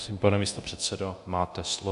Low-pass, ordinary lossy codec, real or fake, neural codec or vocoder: 10.8 kHz; AAC, 96 kbps; fake; codec, 24 kHz, 0.9 kbps, DualCodec